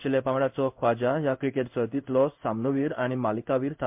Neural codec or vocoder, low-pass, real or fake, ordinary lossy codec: codec, 16 kHz in and 24 kHz out, 1 kbps, XY-Tokenizer; 3.6 kHz; fake; MP3, 32 kbps